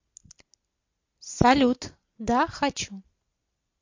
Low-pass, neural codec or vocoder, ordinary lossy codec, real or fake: 7.2 kHz; none; MP3, 48 kbps; real